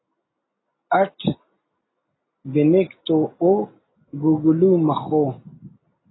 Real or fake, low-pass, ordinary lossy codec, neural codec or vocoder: real; 7.2 kHz; AAC, 16 kbps; none